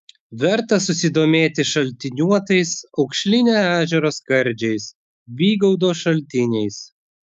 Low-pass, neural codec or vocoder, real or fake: 14.4 kHz; autoencoder, 48 kHz, 128 numbers a frame, DAC-VAE, trained on Japanese speech; fake